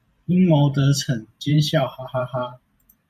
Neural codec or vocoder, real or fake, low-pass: vocoder, 44.1 kHz, 128 mel bands every 512 samples, BigVGAN v2; fake; 14.4 kHz